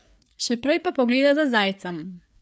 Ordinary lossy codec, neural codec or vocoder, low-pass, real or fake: none; codec, 16 kHz, 4 kbps, FreqCodec, larger model; none; fake